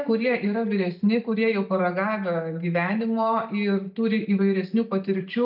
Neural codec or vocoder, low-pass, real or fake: none; 5.4 kHz; real